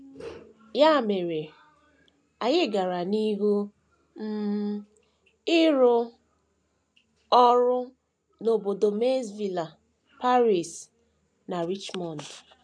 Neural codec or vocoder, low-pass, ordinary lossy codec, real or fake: none; 9.9 kHz; none; real